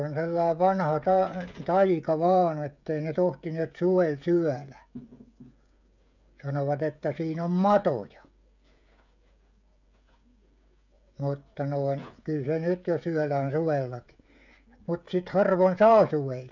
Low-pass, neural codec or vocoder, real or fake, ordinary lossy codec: 7.2 kHz; codec, 16 kHz, 16 kbps, FreqCodec, smaller model; fake; none